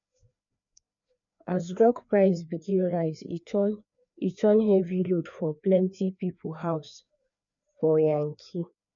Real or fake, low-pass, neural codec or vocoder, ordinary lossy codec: fake; 7.2 kHz; codec, 16 kHz, 2 kbps, FreqCodec, larger model; none